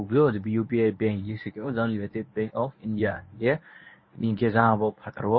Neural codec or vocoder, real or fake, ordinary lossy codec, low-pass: codec, 24 kHz, 0.9 kbps, WavTokenizer, medium speech release version 1; fake; MP3, 24 kbps; 7.2 kHz